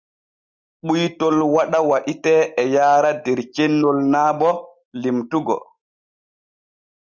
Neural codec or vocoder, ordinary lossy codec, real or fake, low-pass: none; Opus, 64 kbps; real; 7.2 kHz